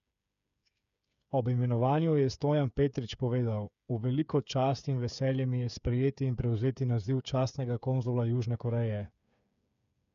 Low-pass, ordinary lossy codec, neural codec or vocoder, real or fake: 7.2 kHz; none; codec, 16 kHz, 8 kbps, FreqCodec, smaller model; fake